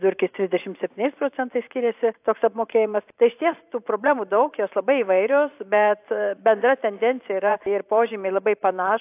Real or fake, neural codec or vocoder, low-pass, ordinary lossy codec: fake; vocoder, 44.1 kHz, 128 mel bands every 512 samples, BigVGAN v2; 3.6 kHz; AAC, 32 kbps